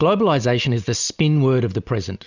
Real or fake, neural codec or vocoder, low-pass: real; none; 7.2 kHz